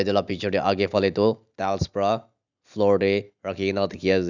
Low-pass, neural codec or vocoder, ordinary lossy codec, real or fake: 7.2 kHz; none; none; real